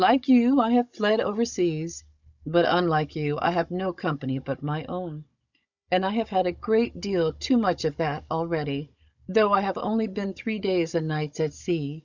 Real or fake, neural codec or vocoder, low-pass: fake; codec, 16 kHz, 16 kbps, FunCodec, trained on Chinese and English, 50 frames a second; 7.2 kHz